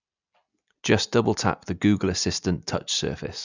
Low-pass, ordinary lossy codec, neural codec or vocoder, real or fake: 7.2 kHz; none; none; real